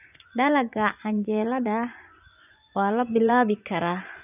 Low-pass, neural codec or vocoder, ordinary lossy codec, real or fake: 3.6 kHz; vocoder, 24 kHz, 100 mel bands, Vocos; none; fake